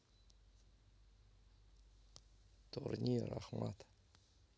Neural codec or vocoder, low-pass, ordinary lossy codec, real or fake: none; none; none; real